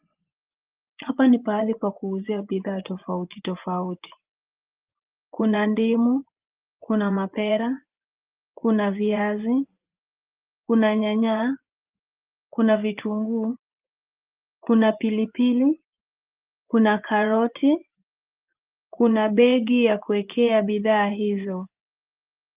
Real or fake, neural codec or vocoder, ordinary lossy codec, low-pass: fake; vocoder, 24 kHz, 100 mel bands, Vocos; Opus, 32 kbps; 3.6 kHz